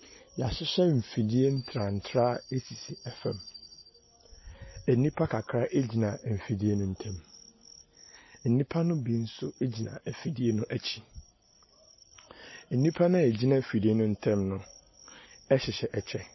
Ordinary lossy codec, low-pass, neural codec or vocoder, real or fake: MP3, 24 kbps; 7.2 kHz; none; real